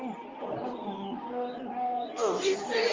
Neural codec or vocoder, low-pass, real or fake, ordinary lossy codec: codec, 24 kHz, 0.9 kbps, WavTokenizer, medium speech release version 2; 7.2 kHz; fake; Opus, 32 kbps